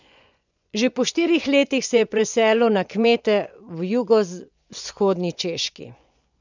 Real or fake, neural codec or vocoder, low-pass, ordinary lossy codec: fake; vocoder, 24 kHz, 100 mel bands, Vocos; 7.2 kHz; none